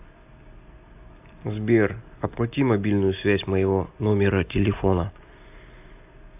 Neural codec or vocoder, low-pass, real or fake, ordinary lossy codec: none; 3.6 kHz; real; AAC, 32 kbps